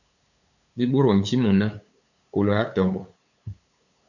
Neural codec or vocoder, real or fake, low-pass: codec, 16 kHz, 8 kbps, FunCodec, trained on LibriTTS, 25 frames a second; fake; 7.2 kHz